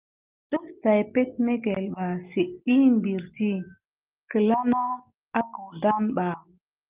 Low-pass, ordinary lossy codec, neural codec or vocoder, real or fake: 3.6 kHz; Opus, 32 kbps; none; real